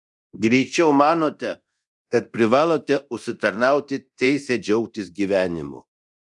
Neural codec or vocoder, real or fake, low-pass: codec, 24 kHz, 0.9 kbps, DualCodec; fake; 10.8 kHz